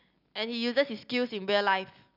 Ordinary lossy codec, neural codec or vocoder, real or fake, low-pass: none; none; real; 5.4 kHz